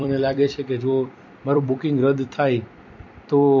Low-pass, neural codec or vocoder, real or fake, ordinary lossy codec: 7.2 kHz; vocoder, 44.1 kHz, 128 mel bands every 256 samples, BigVGAN v2; fake; MP3, 48 kbps